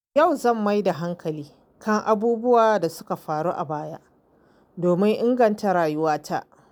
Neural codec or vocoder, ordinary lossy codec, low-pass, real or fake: none; none; none; real